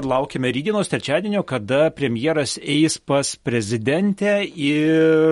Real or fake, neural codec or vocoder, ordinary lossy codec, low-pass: real; none; MP3, 48 kbps; 19.8 kHz